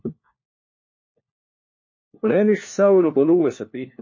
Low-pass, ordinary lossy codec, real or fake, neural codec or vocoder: 7.2 kHz; MP3, 32 kbps; fake; codec, 16 kHz, 1 kbps, FunCodec, trained on LibriTTS, 50 frames a second